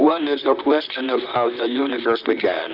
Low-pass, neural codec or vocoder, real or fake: 5.4 kHz; vocoder, 44.1 kHz, 128 mel bands, Pupu-Vocoder; fake